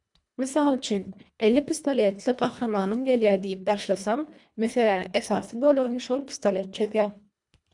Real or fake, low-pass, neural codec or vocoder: fake; 10.8 kHz; codec, 24 kHz, 1.5 kbps, HILCodec